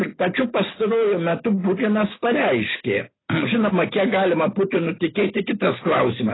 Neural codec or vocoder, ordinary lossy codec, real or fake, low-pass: none; AAC, 16 kbps; real; 7.2 kHz